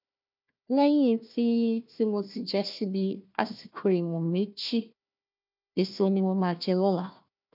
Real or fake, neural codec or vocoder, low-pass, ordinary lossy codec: fake; codec, 16 kHz, 1 kbps, FunCodec, trained on Chinese and English, 50 frames a second; 5.4 kHz; none